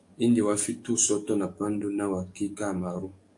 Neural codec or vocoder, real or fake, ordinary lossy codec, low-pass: codec, 44.1 kHz, 7.8 kbps, DAC; fake; AAC, 64 kbps; 10.8 kHz